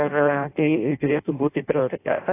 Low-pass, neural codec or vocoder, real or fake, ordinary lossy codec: 3.6 kHz; codec, 16 kHz in and 24 kHz out, 0.6 kbps, FireRedTTS-2 codec; fake; AAC, 24 kbps